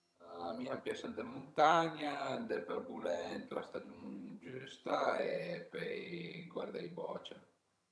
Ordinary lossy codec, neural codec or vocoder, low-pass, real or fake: none; vocoder, 22.05 kHz, 80 mel bands, HiFi-GAN; none; fake